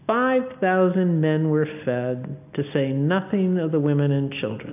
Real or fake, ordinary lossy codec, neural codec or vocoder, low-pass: real; AAC, 32 kbps; none; 3.6 kHz